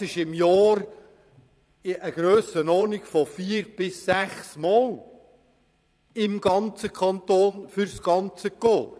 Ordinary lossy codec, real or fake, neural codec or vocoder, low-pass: none; fake; vocoder, 22.05 kHz, 80 mel bands, Vocos; none